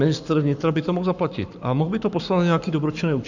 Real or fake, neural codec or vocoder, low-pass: fake; codec, 44.1 kHz, 7.8 kbps, Pupu-Codec; 7.2 kHz